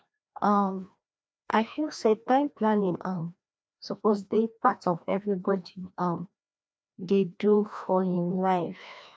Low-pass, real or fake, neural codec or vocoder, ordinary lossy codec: none; fake; codec, 16 kHz, 1 kbps, FreqCodec, larger model; none